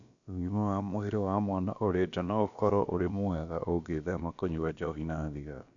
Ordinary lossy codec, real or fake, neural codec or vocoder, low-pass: none; fake; codec, 16 kHz, about 1 kbps, DyCAST, with the encoder's durations; 7.2 kHz